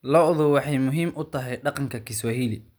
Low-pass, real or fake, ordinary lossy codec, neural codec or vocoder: none; real; none; none